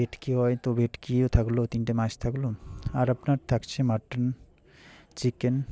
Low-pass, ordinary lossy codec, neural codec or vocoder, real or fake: none; none; none; real